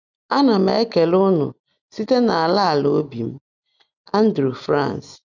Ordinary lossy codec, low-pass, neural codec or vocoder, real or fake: none; 7.2 kHz; none; real